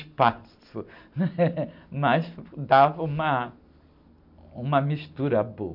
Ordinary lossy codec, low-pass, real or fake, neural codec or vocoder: MP3, 48 kbps; 5.4 kHz; real; none